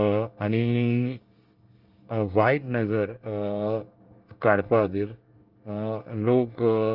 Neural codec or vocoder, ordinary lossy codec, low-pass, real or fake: codec, 24 kHz, 1 kbps, SNAC; Opus, 24 kbps; 5.4 kHz; fake